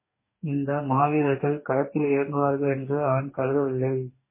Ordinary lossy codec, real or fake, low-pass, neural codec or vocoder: MP3, 16 kbps; fake; 3.6 kHz; codec, 44.1 kHz, 2.6 kbps, DAC